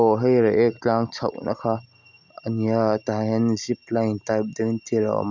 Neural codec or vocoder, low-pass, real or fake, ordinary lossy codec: none; 7.2 kHz; real; none